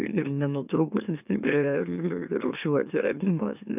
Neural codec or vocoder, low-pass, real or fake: autoencoder, 44.1 kHz, a latent of 192 numbers a frame, MeloTTS; 3.6 kHz; fake